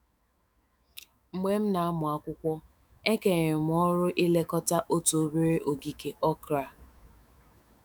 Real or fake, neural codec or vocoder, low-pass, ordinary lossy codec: fake; autoencoder, 48 kHz, 128 numbers a frame, DAC-VAE, trained on Japanese speech; none; none